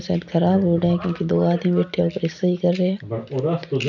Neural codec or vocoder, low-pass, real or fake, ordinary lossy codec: none; 7.2 kHz; real; none